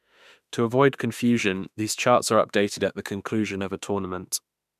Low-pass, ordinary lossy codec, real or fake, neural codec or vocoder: 14.4 kHz; none; fake; autoencoder, 48 kHz, 32 numbers a frame, DAC-VAE, trained on Japanese speech